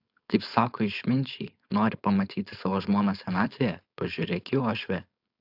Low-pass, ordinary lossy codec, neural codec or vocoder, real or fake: 5.4 kHz; AAC, 48 kbps; codec, 16 kHz, 4.8 kbps, FACodec; fake